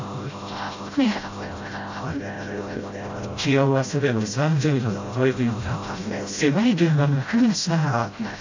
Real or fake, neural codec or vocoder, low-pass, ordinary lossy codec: fake; codec, 16 kHz, 0.5 kbps, FreqCodec, smaller model; 7.2 kHz; AAC, 48 kbps